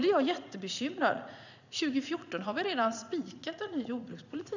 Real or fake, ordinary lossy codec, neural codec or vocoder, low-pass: fake; none; vocoder, 44.1 kHz, 80 mel bands, Vocos; 7.2 kHz